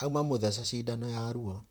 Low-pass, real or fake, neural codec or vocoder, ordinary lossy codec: none; fake; vocoder, 44.1 kHz, 128 mel bands, Pupu-Vocoder; none